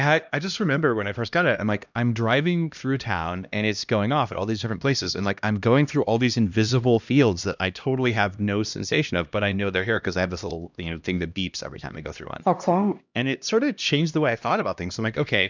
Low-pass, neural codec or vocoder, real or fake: 7.2 kHz; codec, 16 kHz, 1 kbps, X-Codec, HuBERT features, trained on LibriSpeech; fake